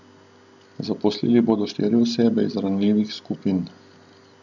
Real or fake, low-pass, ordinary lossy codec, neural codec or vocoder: real; 7.2 kHz; none; none